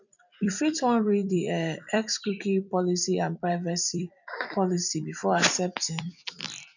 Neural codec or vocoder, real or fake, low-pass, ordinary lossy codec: vocoder, 44.1 kHz, 128 mel bands every 256 samples, BigVGAN v2; fake; 7.2 kHz; none